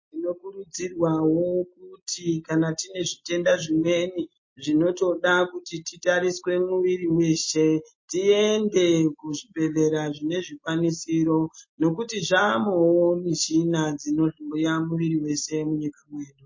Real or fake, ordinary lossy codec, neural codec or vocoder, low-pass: real; MP3, 32 kbps; none; 7.2 kHz